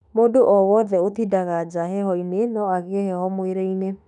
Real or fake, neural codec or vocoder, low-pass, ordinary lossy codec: fake; autoencoder, 48 kHz, 32 numbers a frame, DAC-VAE, trained on Japanese speech; 10.8 kHz; none